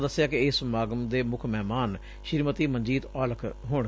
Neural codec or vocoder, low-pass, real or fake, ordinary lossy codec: none; none; real; none